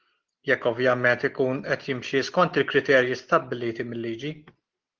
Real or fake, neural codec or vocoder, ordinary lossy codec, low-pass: real; none; Opus, 16 kbps; 7.2 kHz